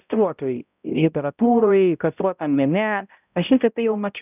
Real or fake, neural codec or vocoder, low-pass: fake; codec, 16 kHz, 0.5 kbps, X-Codec, HuBERT features, trained on balanced general audio; 3.6 kHz